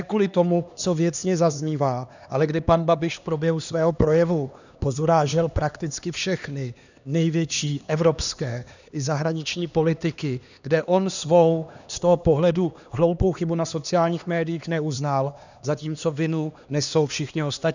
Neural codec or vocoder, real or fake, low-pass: codec, 16 kHz, 2 kbps, X-Codec, HuBERT features, trained on LibriSpeech; fake; 7.2 kHz